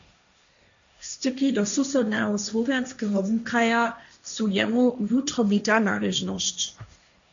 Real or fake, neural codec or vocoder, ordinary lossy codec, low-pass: fake; codec, 16 kHz, 1.1 kbps, Voila-Tokenizer; MP3, 64 kbps; 7.2 kHz